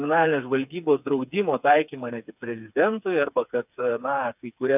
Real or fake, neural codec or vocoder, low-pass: fake; codec, 16 kHz, 4 kbps, FreqCodec, smaller model; 3.6 kHz